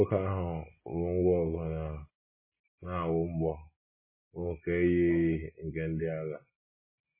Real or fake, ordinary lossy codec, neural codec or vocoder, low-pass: real; MP3, 16 kbps; none; 3.6 kHz